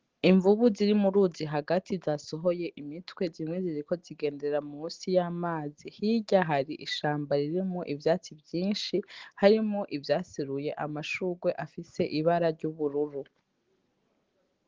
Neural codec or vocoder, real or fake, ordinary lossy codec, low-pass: none; real; Opus, 16 kbps; 7.2 kHz